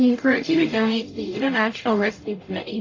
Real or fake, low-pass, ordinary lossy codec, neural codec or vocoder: fake; 7.2 kHz; AAC, 32 kbps; codec, 44.1 kHz, 0.9 kbps, DAC